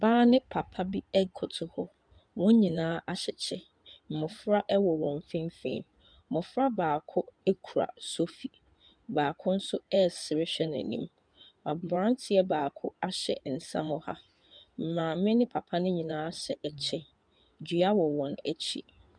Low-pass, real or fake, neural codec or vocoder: 9.9 kHz; fake; codec, 16 kHz in and 24 kHz out, 2.2 kbps, FireRedTTS-2 codec